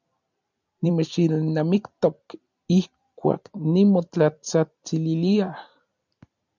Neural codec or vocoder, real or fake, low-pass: vocoder, 44.1 kHz, 128 mel bands every 256 samples, BigVGAN v2; fake; 7.2 kHz